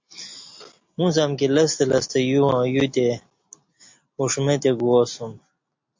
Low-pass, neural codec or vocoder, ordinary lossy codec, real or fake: 7.2 kHz; none; MP3, 48 kbps; real